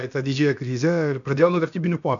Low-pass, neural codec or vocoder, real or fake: 7.2 kHz; codec, 16 kHz, about 1 kbps, DyCAST, with the encoder's durations; fake